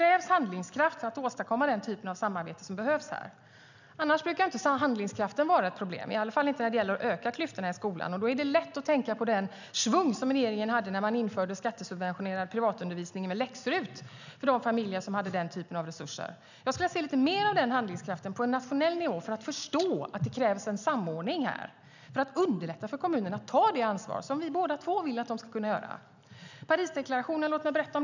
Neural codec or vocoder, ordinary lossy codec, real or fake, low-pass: none; none; real; 7.2 kHz